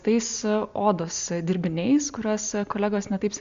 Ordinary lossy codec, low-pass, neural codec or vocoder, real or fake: Opus, 64 kbps; 7.2 kHz; none; real